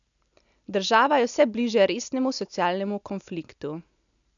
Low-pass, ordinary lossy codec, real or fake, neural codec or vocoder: 7.2 kHz; none; real; none